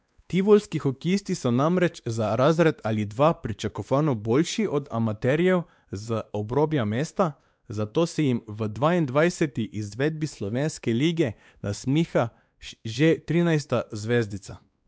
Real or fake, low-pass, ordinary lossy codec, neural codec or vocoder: fake; none; none; codec, 16 kHz, 2 kbps, X-Codec, WavLM features, trained on Multilingual LibriSpeech